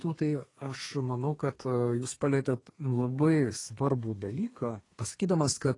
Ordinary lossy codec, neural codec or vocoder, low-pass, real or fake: AAC, 32 kbps; codec, 32 kHz, 1.9 kbps, SNAC; 10.8 kHz; fake